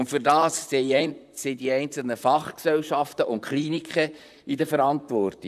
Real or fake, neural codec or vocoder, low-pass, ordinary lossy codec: fake; vocoder, 44.1 kHz, 128 mel bands, Pupu-Vocoder; 14.4 kHz; none